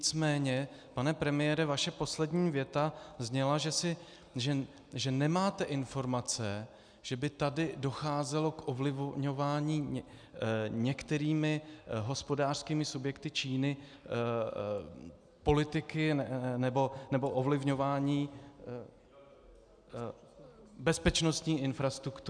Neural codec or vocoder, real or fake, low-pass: none; real; 9.9 kHz